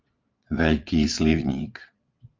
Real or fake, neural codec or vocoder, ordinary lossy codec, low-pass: fake; vocoder, 22.05 kHz, 80 mel bands, Vocos; Opus, 24 kbps; 7.2 kHz